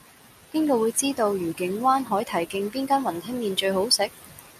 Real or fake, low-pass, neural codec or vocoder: real; 14.4 kHz; none